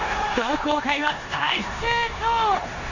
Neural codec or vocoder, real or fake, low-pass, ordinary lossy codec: codec, 16 kHz in and 24 kHz out, 0.9 kbps, LongCat-Audio-Codec, four codebook decoder; fake; 7.2 kHz; none